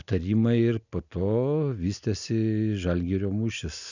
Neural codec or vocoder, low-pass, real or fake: none; 7.2 kHz; real